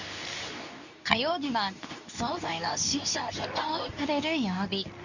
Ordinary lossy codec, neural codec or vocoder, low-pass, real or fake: none; codec, 24 kHz, 0.9 kbps, WavTokenizer, medium speech release version 2; 7.2 kHz; fake